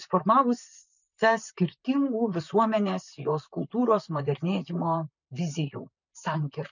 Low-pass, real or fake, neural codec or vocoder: 7.2 kHz; fake; vocoder, 44.1 kHz, 128 mel bands, Pupu-Vocoder